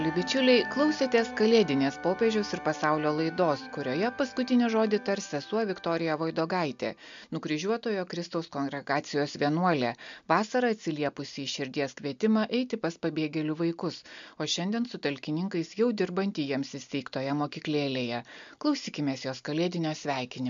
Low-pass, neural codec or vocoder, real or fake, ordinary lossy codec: 7.2 kHz; none; real; MP3, 64 kbps